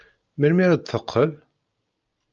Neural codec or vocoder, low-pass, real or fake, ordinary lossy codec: none; 7.2 kHz; real; Opus, 24 kbps